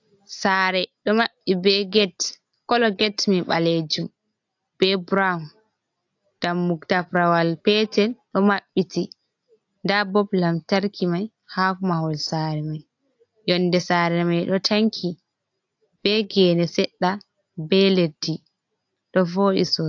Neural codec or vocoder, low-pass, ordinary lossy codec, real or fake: none; 7.2 kHz; AAC, 48 kbps; real